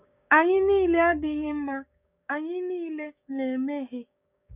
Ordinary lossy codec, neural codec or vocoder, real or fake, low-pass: none; codec, 16 kHz, 6 kbps, DAC; fake; 3.6 kHz